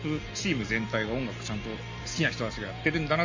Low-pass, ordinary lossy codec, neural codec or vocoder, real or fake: 7.2 kHz; Opus, 32 kbps; none; real